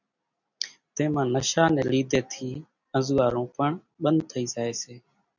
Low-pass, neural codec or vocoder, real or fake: 7.2 kHz; none; real